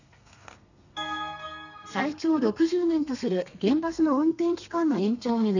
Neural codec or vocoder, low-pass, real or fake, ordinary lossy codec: codec, 32 kHz, 1.9 kbps, SNAC; 7.2 kHz; fake; none